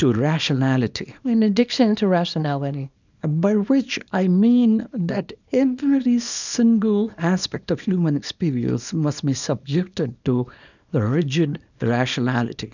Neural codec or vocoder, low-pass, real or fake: codec, 24 kHz, 0.9 kbps, WavTokenizer, small release; 7.2 kHz; fake